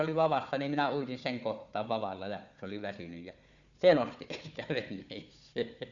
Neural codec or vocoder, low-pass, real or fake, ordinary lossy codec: codec, 16 kHz, 4 kbps, FunCodec, trained on Chinese and English, 50 frames a second; 7.2 kHz; fake; none